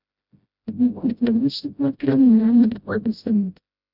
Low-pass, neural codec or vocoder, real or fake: 5.4 kHz; codec, 16 kHz, 0.5 kbps, FreqCodec, smaller model; fake